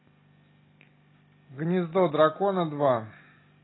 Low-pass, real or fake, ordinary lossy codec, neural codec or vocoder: 7.2 kHz; real; AAC, 16 kbps; none